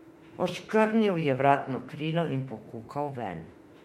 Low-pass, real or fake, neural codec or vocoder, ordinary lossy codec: 19.8 kHz; fake; autoencoder, 48 kHz, 32 numbers a frame, DAC-VAE, trained on Japanese speech; MP3, 64 kbps